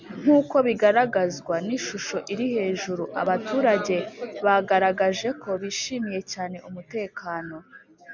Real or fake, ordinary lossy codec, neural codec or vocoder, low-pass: real; MP3, 64 kbps; none; 7.2 kHz